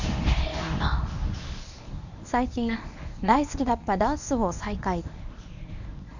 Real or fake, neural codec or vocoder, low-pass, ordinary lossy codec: fake; codec, 24 kHz, 0.9 kbps, WavTokenizer, medium speech release version 1; 7.2 kHz; none